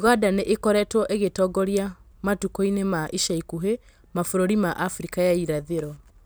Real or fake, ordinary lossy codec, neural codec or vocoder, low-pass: real; none; none; none